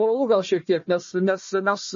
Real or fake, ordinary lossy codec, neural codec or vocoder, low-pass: fake; MP3, 32 kbps; codec, 16 kHz, 1 kbps, FunCodec, trained on Chinese and English, 50 frames a second; 7.2 kHz